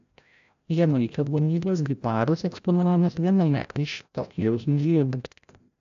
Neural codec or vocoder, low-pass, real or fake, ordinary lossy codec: codec, 16 kHz, 0.5 kbps, FreqCodec, larger model; 7.2 kHz; fake; AAC, 96 kbps